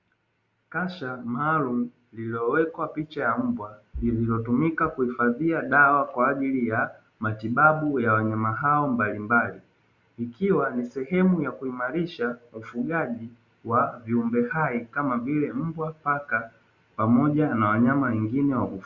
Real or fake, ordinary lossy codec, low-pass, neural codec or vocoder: real; Opus, 64 kbps; 7.2 kHz; none